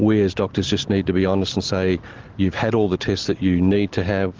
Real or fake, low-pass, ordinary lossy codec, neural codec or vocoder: real; 7.2 kHz; Opus, 16 kbps; none